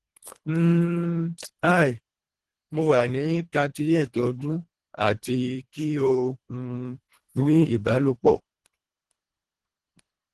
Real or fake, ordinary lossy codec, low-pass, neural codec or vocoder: fake; Opus, 16 kbps; 10.8 kHz; codec, 24 kHz, 1.5 kbps, HILCodec